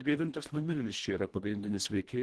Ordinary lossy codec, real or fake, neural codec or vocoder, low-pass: Opus, 16 kbps; fake; codec, 24 kHz, 1.5 kbps, HILCodec; 10.8 kHz